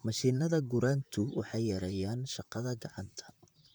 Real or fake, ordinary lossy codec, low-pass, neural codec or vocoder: fake; none; none; vocoder, 44.1 kHz, 128 mel bands, Pupu-Vocoder